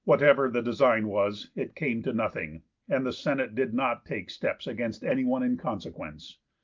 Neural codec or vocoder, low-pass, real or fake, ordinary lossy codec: none; 7.2 kHz; real; Opus, 32 kbps